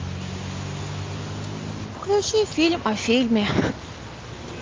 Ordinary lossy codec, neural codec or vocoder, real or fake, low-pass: Opus, 32 kbps; none; real; 7.2 kHz